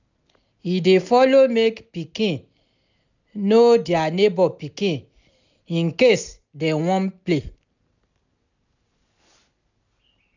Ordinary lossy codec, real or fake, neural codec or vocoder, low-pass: none; real; none; 7.2 kHz